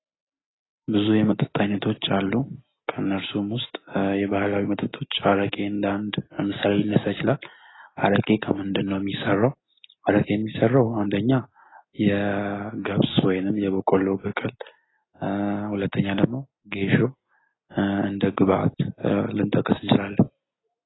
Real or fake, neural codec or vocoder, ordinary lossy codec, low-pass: real; none; AAC, 16 kbps; 7.2 kHz